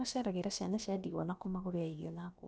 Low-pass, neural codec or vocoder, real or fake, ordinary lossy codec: none; codec, 16 kHz, about 1 kbps, DyCAST, with the encoder's durations; fake; none